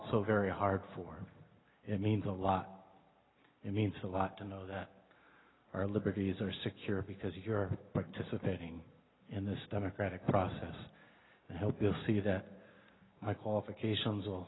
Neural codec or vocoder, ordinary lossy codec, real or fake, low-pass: none; AAC, 16 kbps; real; 7.2 kHz